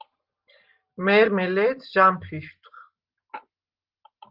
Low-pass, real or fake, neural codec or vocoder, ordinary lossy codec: 5.4 kHz; real; none; Opus, 32 kbps